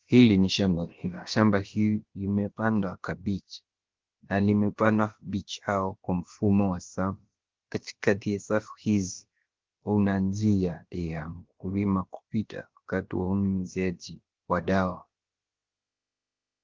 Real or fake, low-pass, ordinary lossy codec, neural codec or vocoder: fake; 7.2 kHz; Opus, 16 kbps; codec, 16 kHz, about 1 kbps, DyCAST, with the encoder's durations